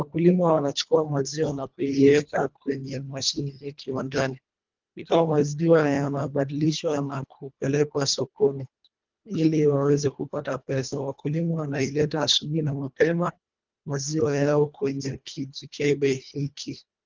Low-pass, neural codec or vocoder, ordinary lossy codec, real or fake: 7.2 kHz; codec, 24 kHz, 1.5 kbps, HILCodec; Opus, 24 kbps; fake